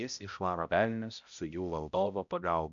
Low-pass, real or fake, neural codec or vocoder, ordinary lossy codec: 7.2 kHz; fake; codec, 16 kHz, 0.5 kbps, X-Codec, HuBERT features, trained on balanced general audio; MP3, 96 kbps